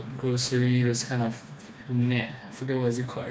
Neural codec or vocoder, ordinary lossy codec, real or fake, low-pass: codec, 16 kHz, 2 kbps, FreqCodec, smaller model; none; fake; none